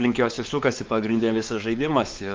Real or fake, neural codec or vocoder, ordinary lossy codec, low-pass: fake; codec, 16 kHz, 4 kbps, X-Codec, WavLM features, trained on Multilingual LibriSpeech; Opus, 24 kbps; 7.2 kHz